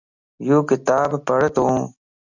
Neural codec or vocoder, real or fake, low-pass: none; real; 7.2 kHz